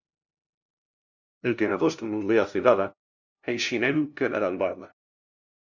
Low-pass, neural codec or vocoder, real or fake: 7.2 kHz; codec, 16 kHz, 0.5 kbps, FunCodec, trained on LibriTTS, 25 frames a second; fake